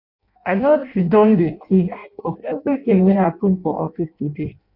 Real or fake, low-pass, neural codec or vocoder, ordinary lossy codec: fake; 5.4 kHz; codec, 16 kHz in and 24 kHz out, 0.6 kbps, FireRedTTS-2 codec; none